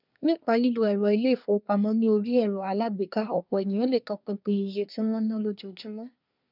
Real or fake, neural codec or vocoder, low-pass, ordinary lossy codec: fake; codec, 24 kHz, 1 kbps, SNAC; 5.4 kHz; none